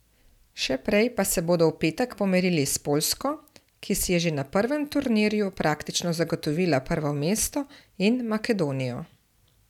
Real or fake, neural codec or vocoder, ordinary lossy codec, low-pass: real; none; none; 19.8 kHz